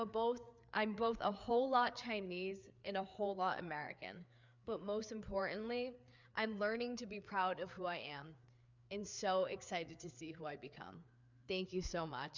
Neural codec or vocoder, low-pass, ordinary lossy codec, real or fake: codec, 16 kHz, 4 kbps, FreqCodec, larger model; 7.2 kHz; MP3, 64 kbps; fake